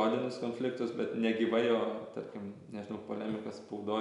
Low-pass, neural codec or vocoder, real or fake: 10.8 kHz; none; real